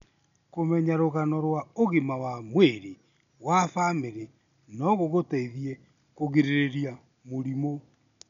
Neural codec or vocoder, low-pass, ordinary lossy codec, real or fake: none; 7.2 kHz; none; real